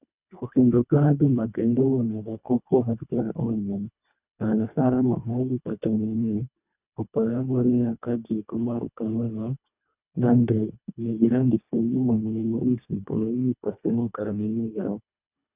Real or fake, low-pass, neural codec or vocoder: fake; 3.6 kHz; codec, 24 kHz, 1.5 kbps, HILCodec